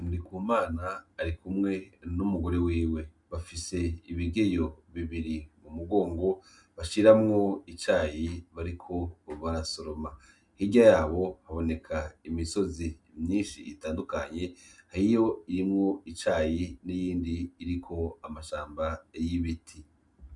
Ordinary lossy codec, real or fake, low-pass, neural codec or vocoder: MP3, 96 kbps; real; 10.8 kHz; none